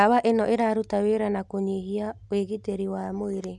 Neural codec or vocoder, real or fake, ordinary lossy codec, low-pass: none; real; none; none